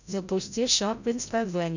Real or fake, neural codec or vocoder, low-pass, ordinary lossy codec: fake; codec, 16 kHz, 0.5 kbps, FreqCodec, larger model; 7.2 kHz; none